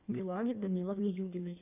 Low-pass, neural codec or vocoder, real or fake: 3.6 kHz; codec, 16 kHz in and 24 kHz out, 1.1 kbps, FireRedTTS-2 codec; fake